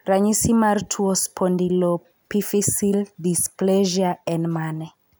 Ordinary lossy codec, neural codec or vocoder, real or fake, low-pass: none; none; real; none